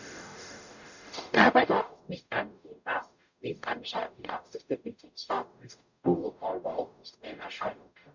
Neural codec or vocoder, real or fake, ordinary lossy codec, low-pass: codec, 44.1 kHz, 0.9 kbps, DAC; fake; none; 7.2 kHz